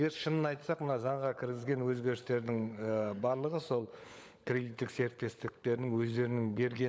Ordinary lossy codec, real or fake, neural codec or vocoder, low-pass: none; fake; codec, 16 kHz, 16 kbps, FunCodec, trained on LibriTTS, 50 frames a second; none